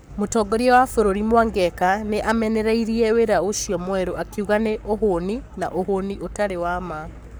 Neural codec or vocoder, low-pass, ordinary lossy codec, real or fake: codec, 44.1 kHz, 7.8 kbps, Pupu-Codec; none; none; fake